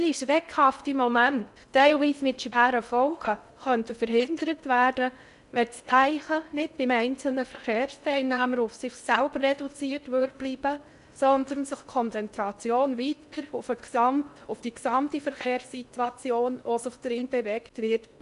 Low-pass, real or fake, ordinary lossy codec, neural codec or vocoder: 10.8 kHz; fake; none; codec, 16 kHz in and 24 kHz out, 0.6 kbps, FocalCodec, streaming, 2048 codes